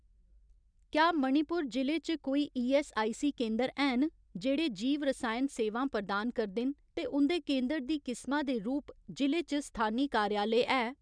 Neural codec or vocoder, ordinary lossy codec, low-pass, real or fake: none; none; 9.9 kHz; real